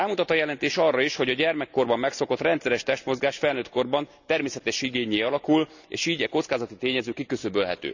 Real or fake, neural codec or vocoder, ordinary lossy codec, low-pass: real; none; none; 7.2 kHz